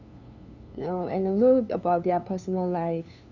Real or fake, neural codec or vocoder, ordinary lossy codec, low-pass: fake; codec, 16 kHz, 2 kbps, FunCodec, trained on LibriTTS, 25 frames a second; none; 7.2 kHz